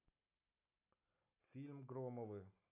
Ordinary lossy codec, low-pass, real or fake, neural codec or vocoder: MP3, 32 kbps; 3.6 kHz; real; none